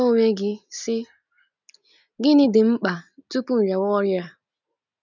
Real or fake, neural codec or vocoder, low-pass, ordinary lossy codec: real; none; 7.2 kHz; none